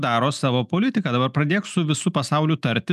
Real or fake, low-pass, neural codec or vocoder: real; 14.4 kHz; none